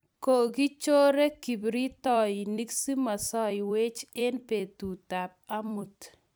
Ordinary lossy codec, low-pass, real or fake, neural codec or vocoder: none; none; fake; vocoder, 44.1 kHz, 128 mel bands every 256 samples, BigVGAN v2